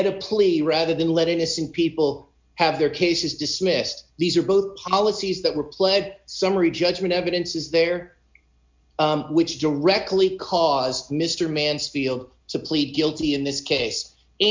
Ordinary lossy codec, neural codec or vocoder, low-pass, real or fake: MP3, 64 kbps; none; 7.2 kHz; real